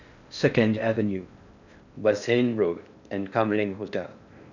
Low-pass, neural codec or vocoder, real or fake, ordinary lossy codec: 7.2 kHz; codec, 16 kHz in and 24 kHz out, 0.6 kbps, FocalCodec, streaming, 4096 codes; fake; none